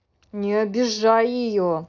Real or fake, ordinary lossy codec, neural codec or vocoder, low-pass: real; none; none; 7.2 kHz